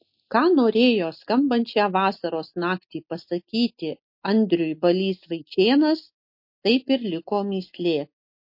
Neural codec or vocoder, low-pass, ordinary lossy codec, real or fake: none; 5.4 kHz; MP3, 32 kbps; real